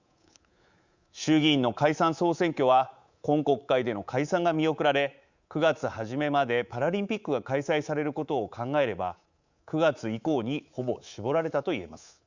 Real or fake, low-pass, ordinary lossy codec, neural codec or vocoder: fake; 7.2 kHz; Opus, 64 kbps; codec, 24 kHz, 3.1 kbps, DualCodec